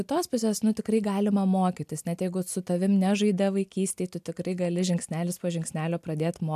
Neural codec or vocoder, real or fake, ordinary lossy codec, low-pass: none; real; AAC, 96 kbps; 14.4 kHz